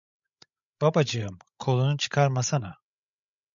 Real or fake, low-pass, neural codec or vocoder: fake; 7.2 kHz; codec, 16 kHz, 16 kbps, FreqCodec, larger model